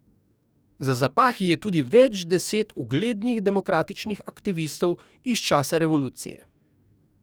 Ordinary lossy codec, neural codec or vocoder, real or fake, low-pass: none; codec, 44.1 kHz, 2.6 kbps, DAC; fake; none